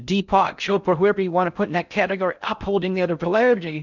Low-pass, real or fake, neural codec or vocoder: 7.2 kHz; fake; codec, 16 kHz in and 24 kHz out, 0.6 kbps, FocalCodec, streaming, 4096 codes